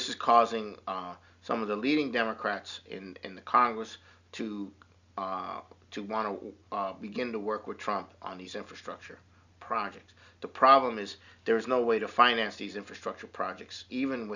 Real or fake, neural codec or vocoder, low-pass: real; none; 7.2 kHz